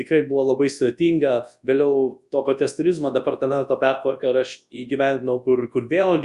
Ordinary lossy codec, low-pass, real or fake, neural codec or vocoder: Opus, 64 kbps; 10.8 kHz; fake; codec, 24 kHz, 0.9 kbps, WavTokenizer, large speech release